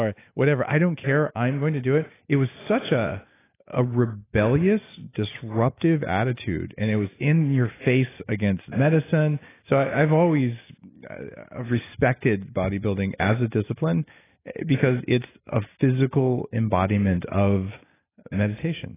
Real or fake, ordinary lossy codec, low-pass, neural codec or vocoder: real; AAC, 16 kbps; 3.6 kHz; none